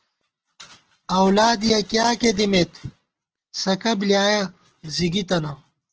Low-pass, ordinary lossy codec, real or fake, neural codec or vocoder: 7.2 kHz; Opus, 16 kbps; real; none